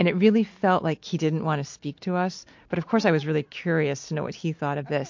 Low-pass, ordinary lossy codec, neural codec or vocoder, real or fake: 7.2 kHz; MP3, 48 kbps; none; real